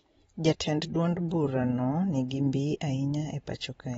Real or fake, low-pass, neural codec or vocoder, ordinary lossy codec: fake; 19.8 kHz; vocoder, 44.1 kHz, 128 mel bands every 512 samples, BigVGAN v2; AAC, 24 kbps